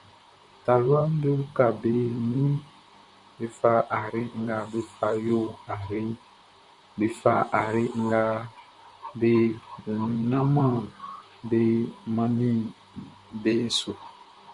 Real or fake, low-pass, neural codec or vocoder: fake; 10.8 kHz; vocoder, 44.1 kHz, 128 mel bands, Pupu-Vocoder